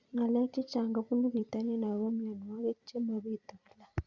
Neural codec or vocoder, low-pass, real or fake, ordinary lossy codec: vocoder, 44.1 kHz, 128 mel bands every 256 samples, BigVGAN v2; 7.2 kHz; fake; Opus, 64 kbps